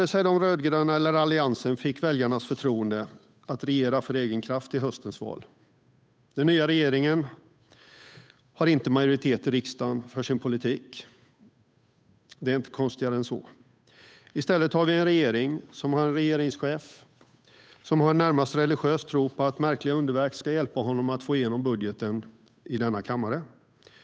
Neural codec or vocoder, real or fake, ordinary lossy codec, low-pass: codec, 16 kHz, 8 kbps, FunCodec, trained on Chinese and English, 25 frames a second; fake; none; none